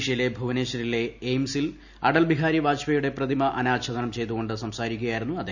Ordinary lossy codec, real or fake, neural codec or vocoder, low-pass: none; real; none; 7.2 kHz